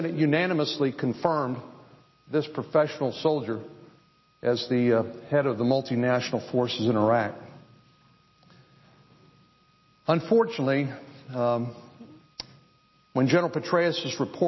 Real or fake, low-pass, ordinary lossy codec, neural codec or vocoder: real; 7.2 kHz; MP3, 24 kbps; none